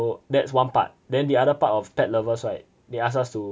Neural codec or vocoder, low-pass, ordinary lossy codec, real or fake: none; none; none; real